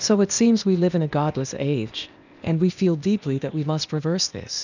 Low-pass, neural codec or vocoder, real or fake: 7.2 kHz; codec, 16 kHz, 0.8 kbps, ZipCodec; fake